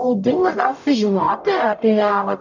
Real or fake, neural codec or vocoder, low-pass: fake; codec, 44.1 kHz, 0.9 kbps, DAC; 7.2 kHz